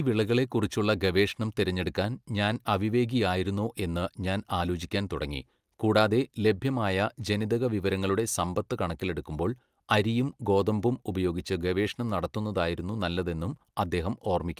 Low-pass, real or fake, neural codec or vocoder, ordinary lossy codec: 14.4 kHz; real; none; Opus, 32 kbps